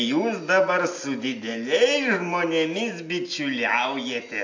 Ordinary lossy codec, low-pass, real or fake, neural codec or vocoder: AAC, 48 kbps; 7.2 kHz; real; none